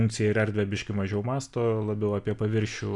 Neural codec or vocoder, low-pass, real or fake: none; 10.8 kHz; real